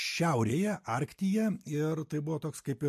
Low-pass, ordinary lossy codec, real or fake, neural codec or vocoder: 14.4 kHz; MP3, 64 kbps; real; none